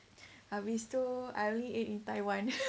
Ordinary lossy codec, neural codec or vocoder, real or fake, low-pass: none; none; real; none